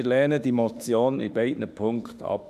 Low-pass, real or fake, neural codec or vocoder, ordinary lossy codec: 14.4 kHz; fake; autoencoder, 48 kHz, 32 numbers a frame, DAC-VAE, trained on Japanese speech; none